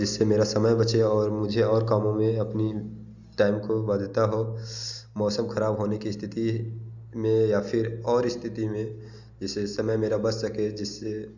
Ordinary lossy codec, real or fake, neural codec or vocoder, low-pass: Opus, 64 kbps; real; none; 7.2 kHz